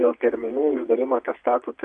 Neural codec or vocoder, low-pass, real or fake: vocoder, 22.05 kHz, 80 mel bands, Vocos; 9.9 kHz; fake